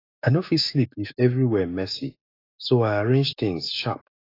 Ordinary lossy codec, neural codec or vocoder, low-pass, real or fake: AAC, 24 kbps; autoencoder, 48 kHz, 128 numbers a frame, DAC-VAE, trained on Japanese speech; 5.4 kHz; fake